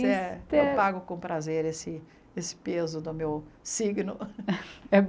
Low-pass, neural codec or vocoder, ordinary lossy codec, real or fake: none; none; none; real